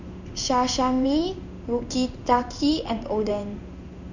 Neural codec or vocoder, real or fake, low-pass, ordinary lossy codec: codec, 16 kHz in and 24 kHz out, 1 kbps, XY-Tokenizer; fake; 7.2 kHz; none